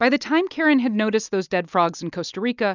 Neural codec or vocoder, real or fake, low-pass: none; real; 7.2 kHz